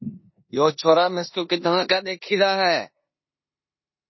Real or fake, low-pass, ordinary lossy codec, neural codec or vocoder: fake; 7.2 kHz; MP3, 24 kbps; codec, 16 kHz in and 24 kHz out, 0.9 kbps, LongCat-Audio-Codec, four codebook decoder